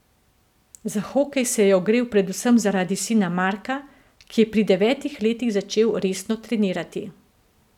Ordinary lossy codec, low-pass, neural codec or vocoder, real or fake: none; 19.8 kHz; none; real